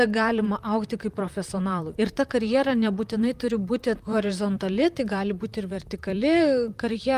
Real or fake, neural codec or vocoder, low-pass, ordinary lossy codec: fake; vocoder, 44.1 kHz, 128 mel bands, Pupu-Vocoder; 14.4 kHz; Opus, 32 kbps